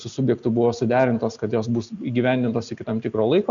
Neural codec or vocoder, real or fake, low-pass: none; real; 7.2 kHz